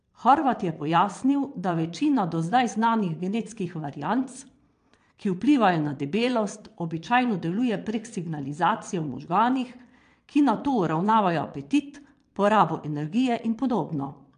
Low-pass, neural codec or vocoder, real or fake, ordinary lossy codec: 9.9 kHz; vocoder, 22.05 kHz, 80 mel bands, Vocos; fake; AAC, 64 kbps